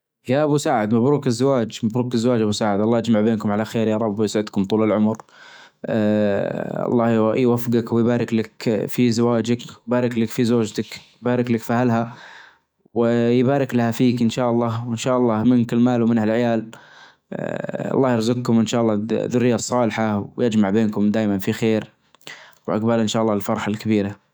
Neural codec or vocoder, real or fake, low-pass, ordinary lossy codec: autoencoder, 48 kHz, 128 numbers a frame, DAC-VAE, trained on Japanese speech; fake; none; none